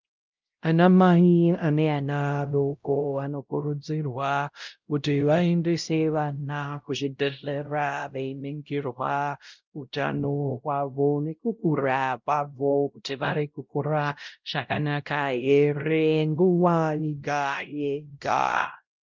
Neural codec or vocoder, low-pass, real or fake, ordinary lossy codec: codec, 16 kHz, 0.5 kbps, X-Codec, WavLM features, trained on Multilingual LibriSpeech; 7.2 kHz; fake; Opus, 24 kbps